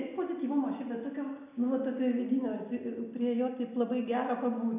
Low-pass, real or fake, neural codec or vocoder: 3.6 kHz; real; none